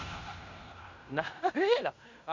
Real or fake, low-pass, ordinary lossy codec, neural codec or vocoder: fake; 7.2 kHz; MP3, 64 kbps; codec, 16 kHz in and 24 kHz out, 0.9 kbps, LongCat-Audio-Codec, fine tuned four codebook decoder